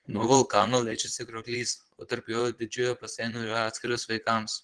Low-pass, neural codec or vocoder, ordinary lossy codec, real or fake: 9.9 kHz; vocoder, 22.05 kHz, 80 mel bands, Vocos; Opus, 16 kbps; fake